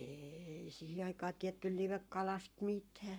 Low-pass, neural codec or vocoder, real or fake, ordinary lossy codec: none; codec, 44.1 kHz, 7.8 kbps, Pupu-Codec; fake; none